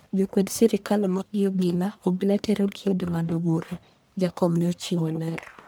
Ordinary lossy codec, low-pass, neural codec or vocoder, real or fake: none; none; codec, 44.1 kHz, 1.7 kbps, Pupu-Codec; fake